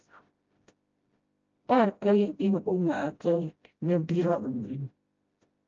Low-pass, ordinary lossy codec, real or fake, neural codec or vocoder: 7.2 kHz; Opus, 24 kbps; fake; codec, 16 kHz, 0.5 kbps, FreqCodec, smaller model